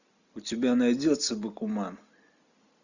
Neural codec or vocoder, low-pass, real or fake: none; 7.2 kHz; real